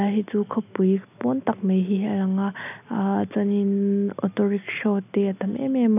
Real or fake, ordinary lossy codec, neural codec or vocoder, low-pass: real; none; none; 3.6 kHz